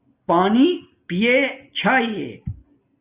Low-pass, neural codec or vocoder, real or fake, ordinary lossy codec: 3.6 kHz; none; real; Opus, 64 kbps